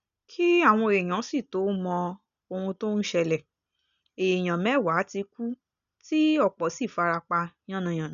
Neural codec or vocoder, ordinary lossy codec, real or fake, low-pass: none; none; real; 7.2 kHz